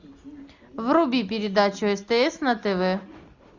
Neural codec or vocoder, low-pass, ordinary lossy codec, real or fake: none; 7.2 kHz; Opus, 64 kbps; real